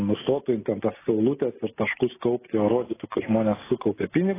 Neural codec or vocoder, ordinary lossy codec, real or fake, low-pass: none; AAC, 16 kbps; real; 3.6 kHz